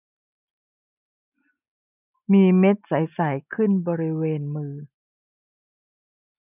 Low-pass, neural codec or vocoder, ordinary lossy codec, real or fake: 3.6 kHz; none; none; real